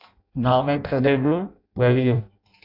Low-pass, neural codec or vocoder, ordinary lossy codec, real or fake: 5.4 kHz; codec, 16 kHz in and 24 kHz out, 0.6 kbps, FireRedTTS-2 codec; AAC, 48 kbps; fake